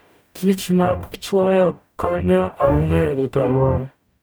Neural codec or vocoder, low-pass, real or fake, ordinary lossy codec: codec, 44.1 kHz, 0.9 kbps, DAC; none; fake; none